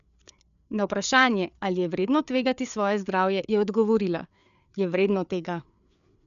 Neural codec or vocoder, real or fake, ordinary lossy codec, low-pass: codec, 16 kHz, 4 kbps, FreqCodec, larger model; fake; none; 7.2 kHz